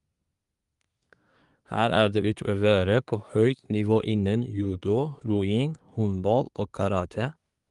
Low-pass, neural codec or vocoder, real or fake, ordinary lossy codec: 10.8 kHz; codec, 24 kHz, 1 kbps, SNAC; fake; Opus, 32 kbps